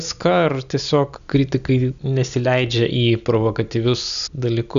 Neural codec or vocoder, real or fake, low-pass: none; real; 7.2 kHz